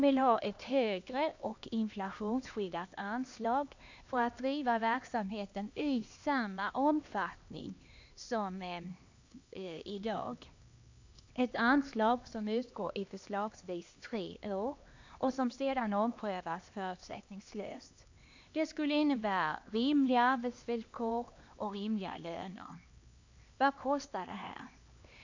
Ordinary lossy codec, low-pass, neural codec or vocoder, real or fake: AAC, 48 kbps; 7.2 kHz; codec, 16 kHz, 2 kbps, X-Codec, HuBERT features, trained on LibriSpeech; fake